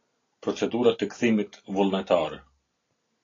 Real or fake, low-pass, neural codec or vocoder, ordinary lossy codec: real; 7.2 kHz; none; AAC, 32 kbps